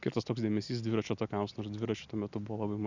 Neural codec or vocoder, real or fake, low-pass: none; real; 7.2 kHz